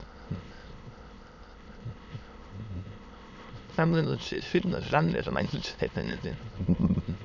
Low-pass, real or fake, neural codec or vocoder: 7.2 kHz; fake; autoencoder, 22.05 kHz, a latent of 192 numbers a frame, VITS, trained on many speakers